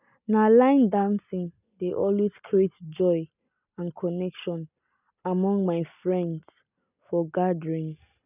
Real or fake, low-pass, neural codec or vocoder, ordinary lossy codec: real; 3.6 kHz; none; none